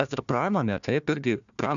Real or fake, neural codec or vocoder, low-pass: fake; codec, 16 kHz, 1 kbps, FunCodec, trained on Chinese and English, 50 frames a second; 7.2 kHz